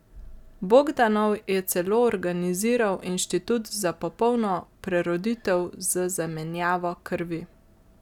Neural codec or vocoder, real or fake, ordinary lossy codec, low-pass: none; real; none; 19.8 kHz